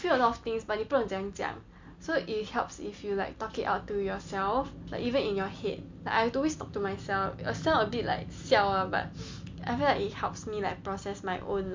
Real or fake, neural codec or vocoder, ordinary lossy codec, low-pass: real; none; MP3, 48 kbps; 7.2 kHz